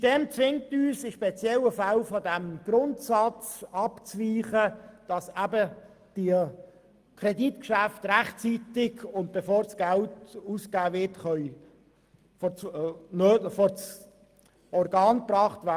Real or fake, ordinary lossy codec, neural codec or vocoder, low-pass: real; Opus, 32 kbps; none; 14.4 kHz